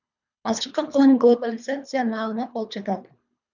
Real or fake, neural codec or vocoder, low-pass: fake; codec, 24 kHz, 3 kbps, HILCodec; 7.2 kHz